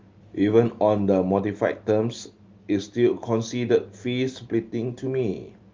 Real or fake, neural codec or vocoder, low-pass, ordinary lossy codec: real; none; 7.2 kHz; Opus, 32 kbps